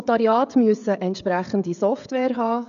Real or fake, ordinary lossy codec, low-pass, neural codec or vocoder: fake; none; 7.2 kHz; codec, 16 kHz, 16 kbps, FreqCodec, smaller model